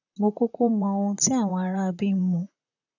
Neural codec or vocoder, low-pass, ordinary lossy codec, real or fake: vocoder, 44.1 kHz, 128 mel bands, Pupu-Vocoder; 7.2 kHz; none; fake